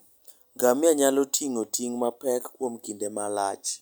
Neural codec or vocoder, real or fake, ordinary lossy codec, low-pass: none; real; none; none